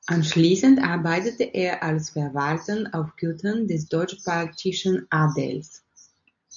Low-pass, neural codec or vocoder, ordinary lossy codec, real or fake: 7.2 kHz; none; MP3, 64 kbps; real